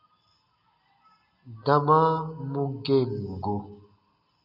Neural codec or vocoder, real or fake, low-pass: none; real; 5.4 kHz